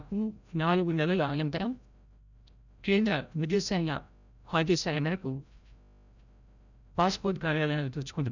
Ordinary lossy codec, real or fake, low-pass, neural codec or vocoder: none; fake; 7.2 kHz; codec, 16 kHz, 0.5 kbps, FreqCodec, larger model